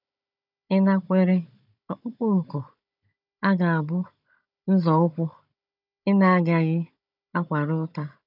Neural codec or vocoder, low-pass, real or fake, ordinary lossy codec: codec, 16 kHz, 16 kbps, FunCodec, trained on Chinese and English, 50 frames a second; 5.4 kHz; fake; none